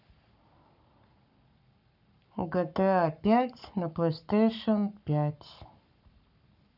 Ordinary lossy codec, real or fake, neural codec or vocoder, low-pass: none; fake; codec, 44.1 kHz, 7.8 kbps, Pupu-Codec; 5.4 kHz